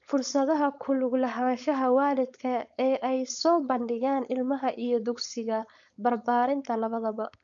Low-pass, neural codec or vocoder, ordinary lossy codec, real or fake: 7.2 kHz; codec, 16 kHz, 4.8 kbps, FACodec; none; fake